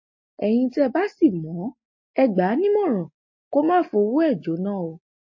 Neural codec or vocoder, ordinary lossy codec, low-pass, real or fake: none; MP3, 32 kbps; 7.2 kHz; real